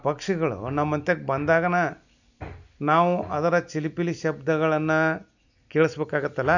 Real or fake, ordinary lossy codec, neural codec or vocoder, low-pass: real; none; none; 7.2 kHz